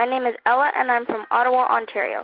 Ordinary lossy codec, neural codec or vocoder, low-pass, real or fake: Opus, 16 kbps; none; 5.4 kHz; real